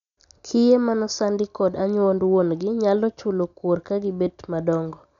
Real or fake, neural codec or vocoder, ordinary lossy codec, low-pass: real; none; none; 7.2 kHz